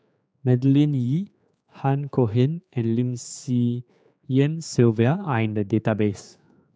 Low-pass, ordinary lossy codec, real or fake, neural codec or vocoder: none; none; fake; codec, 16 kHz, 4 kbps, X-Codec, HuBERT features, trained on general audio